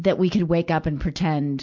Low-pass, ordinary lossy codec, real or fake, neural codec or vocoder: 7.2 kHz; MP3, 64 kbps; real; none